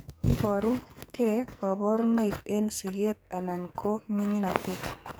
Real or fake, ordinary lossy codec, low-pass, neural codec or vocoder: fake; none; none; codec, 44.1 kHz, 2.6 kbps, SNAC